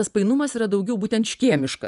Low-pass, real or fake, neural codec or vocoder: 10.8 kHz; fake; vocoder, 24 kHz, 100 mel bands, Vocos